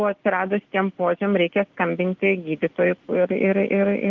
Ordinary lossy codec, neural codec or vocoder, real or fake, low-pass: Opus, 16 kbps; none; real; 7.2 kHz